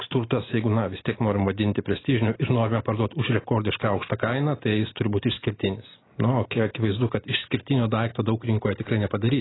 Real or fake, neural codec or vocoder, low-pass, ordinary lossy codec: real; none; 7.2 kHz; AAC, 16 kbps